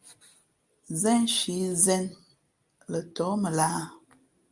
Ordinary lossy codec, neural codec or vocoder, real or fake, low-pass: Opus, 32 kbps; none; real; 10.8 kHz